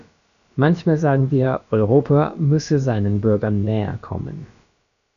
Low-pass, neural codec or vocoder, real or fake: 7.2 kHz; codec, 16 kHz, about 1 kbps, DyCAST, with the encoder's durations; fake